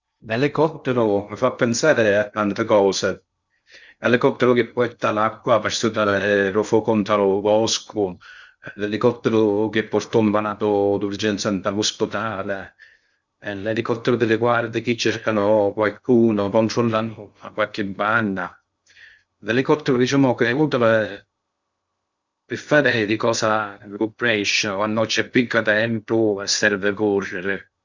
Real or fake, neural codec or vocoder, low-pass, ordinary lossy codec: fake; codec, 16 kHz in and 24 kHz out, 0.6 kbps, FocalCodec, streaming, 2048 codes; 7.2 kHz; Opus, 64 kbps